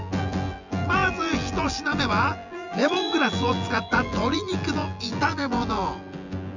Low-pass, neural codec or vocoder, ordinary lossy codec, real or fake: 7.2 kHz; vocoder, 24 kHz, 100 mel bands, Vocos; none; fake